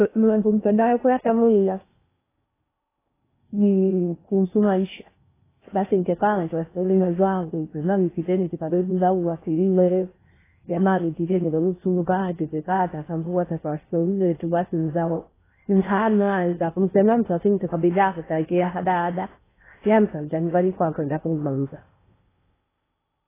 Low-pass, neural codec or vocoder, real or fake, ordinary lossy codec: 3.6 kHz; codec, 16 kHz in and 24 kHz out, 0.6 kbps, FocalCodec, streaming, 2048 codes; fake; AAC, 16 kbps